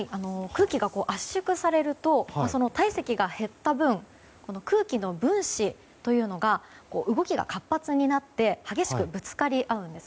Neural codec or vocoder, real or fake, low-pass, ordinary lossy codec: none; real; none; none